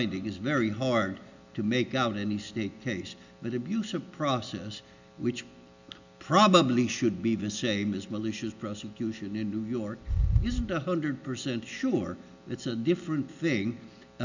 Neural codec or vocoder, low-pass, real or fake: none; 7.2 kHz; real